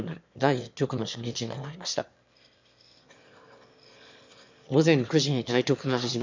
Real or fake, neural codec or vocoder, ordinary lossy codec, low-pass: fake; autoencoder, 22.05 kHz, a latent of 192 numbers a frame, VITS, trained on one speaker; MP3, 64 kbps; 7.2 kHz